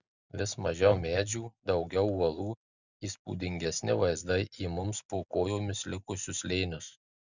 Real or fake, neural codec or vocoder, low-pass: real; none; 7.2 kHz